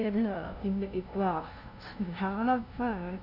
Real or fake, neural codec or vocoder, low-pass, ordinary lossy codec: fake; codec, 16 kHz, 0.5 kbps, FunCodec, trained on LibriTTS, 25 frames a second; 5.4 kHz; none